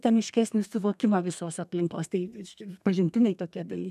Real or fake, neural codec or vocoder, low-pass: fake; codec, 32 kHz, 1.9 kbps, SNAC; 14.4 kHz